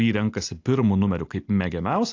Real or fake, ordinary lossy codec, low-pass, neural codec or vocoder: real; AAC, 48 kbps; 7.2 kHz; none